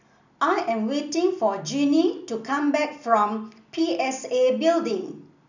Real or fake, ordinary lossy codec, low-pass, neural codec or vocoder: real; none; 7.2 kHz; none